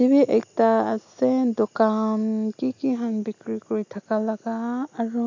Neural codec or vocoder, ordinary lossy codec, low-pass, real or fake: none; MP3, 48 kbps; 7.2 kHz; real